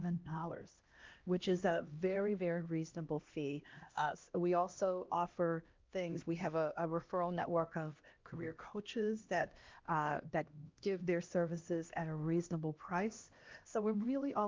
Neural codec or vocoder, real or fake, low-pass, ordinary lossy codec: codec, 16 kHz, 1 kbps, X-Codec, HuBERT features, trained on LibriSpeech; fake; 7.2 kHz; Opus, 32 kbps